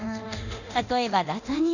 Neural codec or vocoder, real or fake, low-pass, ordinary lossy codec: codec, 24 kHz, 0.9 kbps, DualCodec; fake; 7.2 kHz; none